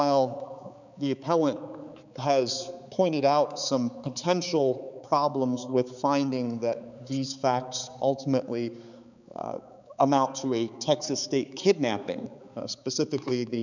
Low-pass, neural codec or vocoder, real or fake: 7.2 kHz; codec, 16 kHz, 4 kbps, X-Codec, HuBERT features, trained on balanced general audio; fake